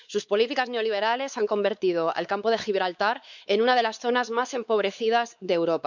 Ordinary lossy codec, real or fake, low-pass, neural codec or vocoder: none; fake; 7.2 kHz; codec, 16 kHz, 4 kbps, X-Codec, WavLM features, trained on Multilingual LibriSpeech